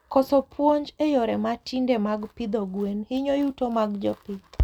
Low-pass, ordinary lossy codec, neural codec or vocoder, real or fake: 19.8 kHz; none; none; real